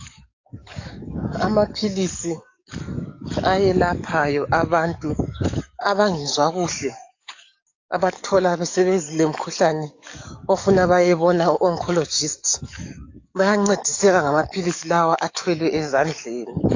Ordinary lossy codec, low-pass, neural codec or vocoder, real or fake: AAC, 48 kbps; 7.2 kHz; codec, 44.1 kHz, 7.8 kbps, DAC; fake